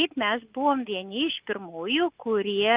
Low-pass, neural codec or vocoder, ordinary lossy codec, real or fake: 3.6 kHz; none; Opus, 32 kbps; real